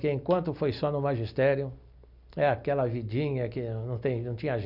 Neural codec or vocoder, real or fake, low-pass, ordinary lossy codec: none; real; 5.4 kHz; none